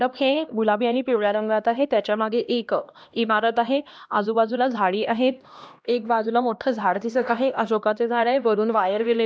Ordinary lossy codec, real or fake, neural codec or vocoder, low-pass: none; fake; codec, 16 kHz, 1 kbps, X-Codec, HuBERT features, trained on LibriSpeech; none